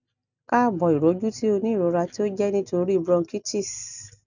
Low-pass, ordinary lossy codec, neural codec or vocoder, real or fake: 7.2 kHz; none; none; real